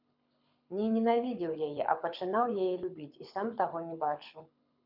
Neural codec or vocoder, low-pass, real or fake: codec, 24 kHz, 6 kbps, HILCodec; 5.4 kHz; fake